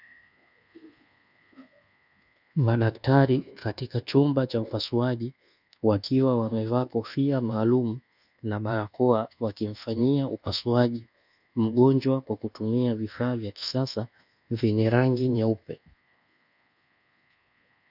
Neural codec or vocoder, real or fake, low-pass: codec, 24 kHz, 1.2 kbps, DualCodec; fake; 5.4 kHz